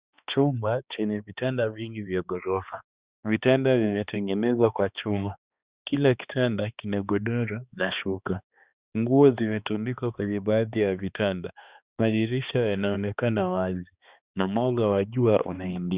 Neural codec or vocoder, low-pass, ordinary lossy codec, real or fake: codec, 16 kHz, 2 kbps, X-Codec, HuBERT features, trained on balanced general audio; 3.6 kHz; Opus, 64 kbps; fake